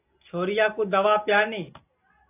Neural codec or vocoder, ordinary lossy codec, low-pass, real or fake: none; AAC, 24 kbps; 3.6 kHz; real